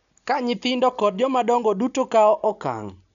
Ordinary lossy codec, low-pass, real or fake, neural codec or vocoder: none; 7.2 kHz; real; none